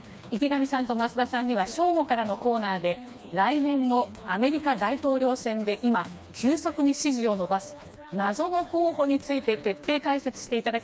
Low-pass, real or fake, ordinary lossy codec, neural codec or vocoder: none; fake; none; codec, 16 kHz, 2 kbps, FreqCodec, smaller model